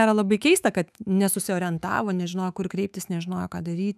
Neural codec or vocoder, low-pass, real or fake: autoencoder, 48 kHz, 128 numbers a frame, DAC-VAE, trained on Japanese speech; 14.4 kHz; fake